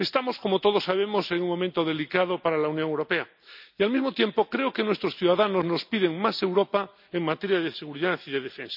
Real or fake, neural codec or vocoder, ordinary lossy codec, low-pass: real; none; none; 5.4 kHz